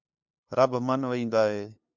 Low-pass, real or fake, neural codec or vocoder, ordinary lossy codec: 7.2 kHz; fake; codec, 16 kHz, 2 kbps, FunCodec, trained on LibriTTS, 25 frames a second; MP3, 64 kbps